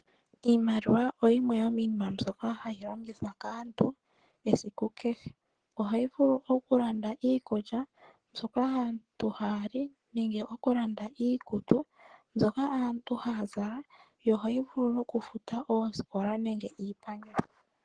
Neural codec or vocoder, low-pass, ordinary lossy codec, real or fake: codec, 44.1 kHz, 7.8 kbps, Pupu-Codec; 9.9 kHz; Opus, 16 kbps; fake